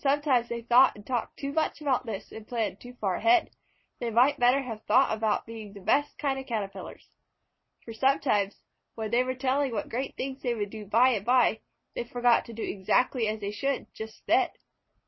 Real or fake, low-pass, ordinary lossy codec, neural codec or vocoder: real; 7.2 kHz; MP3, 24 kbps; none